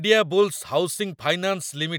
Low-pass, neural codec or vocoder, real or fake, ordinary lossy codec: none; none; real; none